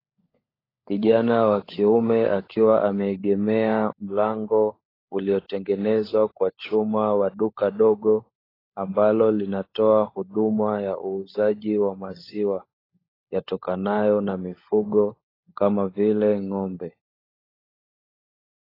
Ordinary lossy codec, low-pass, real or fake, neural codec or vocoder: AAC, 24 kbps; 5.4 kHz; fake; codec, 16 kHz, 16 kbps, FunCodec, trained on LibriTTS, 50 frames a second